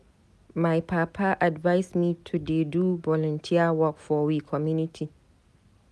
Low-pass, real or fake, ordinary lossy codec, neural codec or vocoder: none; real; none; none